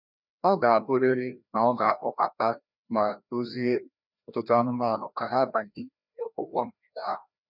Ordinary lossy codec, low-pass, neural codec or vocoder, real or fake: none; 5.4 kHz; codec, 16 kHz, 1 kbps, FreqCodec, larger model; fake